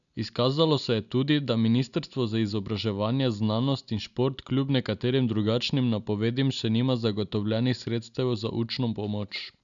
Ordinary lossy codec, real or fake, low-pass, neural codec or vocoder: none; real; 7.2 kHz; none